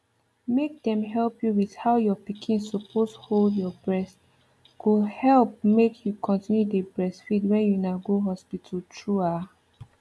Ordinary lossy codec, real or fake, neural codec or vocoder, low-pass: none; real; none; none